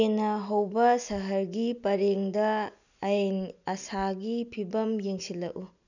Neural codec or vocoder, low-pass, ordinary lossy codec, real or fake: none; 7.2 kHz; none; real